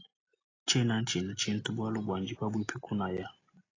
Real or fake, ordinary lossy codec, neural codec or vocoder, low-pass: real; AAC, 32 kbps; none; 7.2 kHz